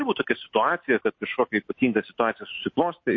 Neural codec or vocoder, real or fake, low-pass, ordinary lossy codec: none; real; 7.2 kHz; MP3, 32 kbps